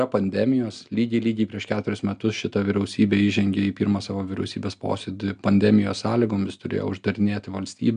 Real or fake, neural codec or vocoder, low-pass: real; none; 9.9 kHz